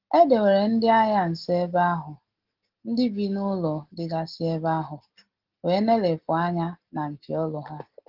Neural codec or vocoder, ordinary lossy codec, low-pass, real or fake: none; Opus, 16 kbps; 5.4 kHz; real